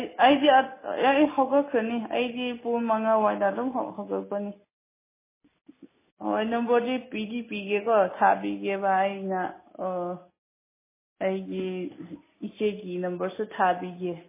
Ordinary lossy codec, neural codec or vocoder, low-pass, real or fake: MP3, 16 kbps; none; 3.6 kHz; real